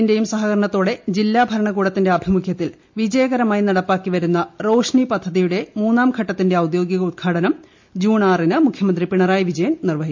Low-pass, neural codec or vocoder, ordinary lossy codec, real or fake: 7.2 kHz; none; MP3, 48 kbps; real